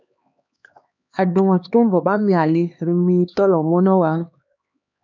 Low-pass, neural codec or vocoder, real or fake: 7.2 kHz; codec, 16 kHz, 2 kbps, X-Codec, HuBERT features, trained on LibriSpeech; fake